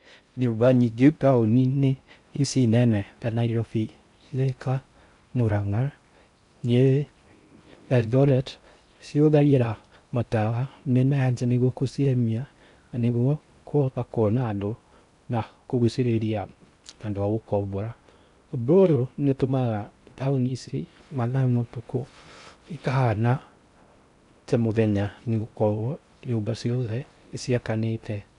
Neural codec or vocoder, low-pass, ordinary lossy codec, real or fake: codec, 16 kHz in and 24 kHz out, 0.6 kbps, FocalCodec, streaming, 4096 codes; 10.8 kHz; none; fake